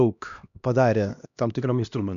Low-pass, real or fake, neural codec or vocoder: 7.2 kHz; fake; codec, 16 kHz, 1 kbps, X-Codec, HuBERT features, trained on LibriSpeech